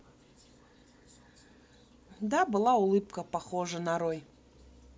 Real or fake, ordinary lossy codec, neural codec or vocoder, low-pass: real; none; none; none